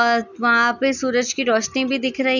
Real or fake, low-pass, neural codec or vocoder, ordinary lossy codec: real; 7.2 kHz; none; none